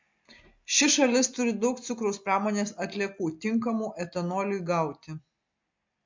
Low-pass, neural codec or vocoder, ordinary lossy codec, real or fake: 7.2 kHz; none; MP3, 48 kbps; real